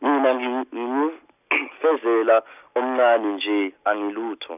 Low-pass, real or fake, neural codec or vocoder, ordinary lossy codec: 3.6 kHz; real; none; none